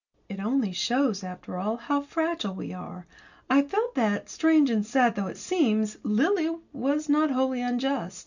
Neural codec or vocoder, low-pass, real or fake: none; 7.2 kHz; real